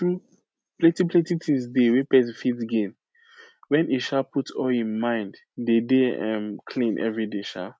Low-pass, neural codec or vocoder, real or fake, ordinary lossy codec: none; none; real; none